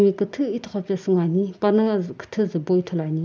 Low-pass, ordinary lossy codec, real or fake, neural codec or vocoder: none; none; real; none